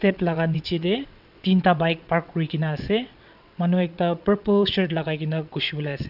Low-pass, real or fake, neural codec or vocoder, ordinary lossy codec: 5.4 kHz; fake; vocoder, 22.05 kHz, 80 mel bands, WaveNeXt; none